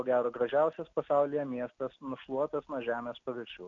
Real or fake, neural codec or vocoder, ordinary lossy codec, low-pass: real; none; MP3, 64 kbps; 7.2 kHz